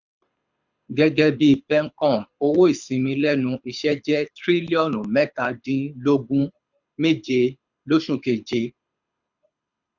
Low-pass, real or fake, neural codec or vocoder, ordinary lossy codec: 7.2 kHz; fake; codec, 24 kHz, 6 kbps, HILCodec; none